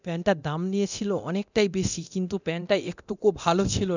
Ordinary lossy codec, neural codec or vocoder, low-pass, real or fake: none; codec, 24 kHz, 0.9 kbps, DualCodec; 7.2 kHz; fake